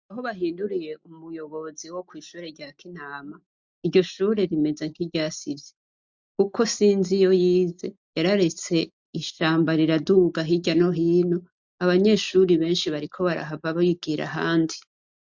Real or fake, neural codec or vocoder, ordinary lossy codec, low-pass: real; none; MP3, 64 kbps; 7.2 kHz